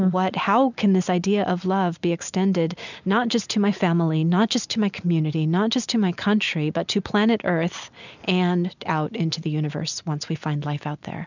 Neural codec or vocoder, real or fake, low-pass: none; real; 7.2 kHz